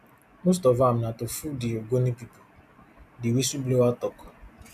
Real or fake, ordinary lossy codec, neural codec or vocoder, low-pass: real; AAC, 96 kbps; none; 14.4 kHz